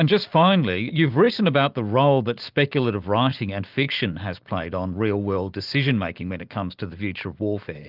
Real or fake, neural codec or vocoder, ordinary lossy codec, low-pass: real; none; Opus, 24 kbps; 5.4 kHz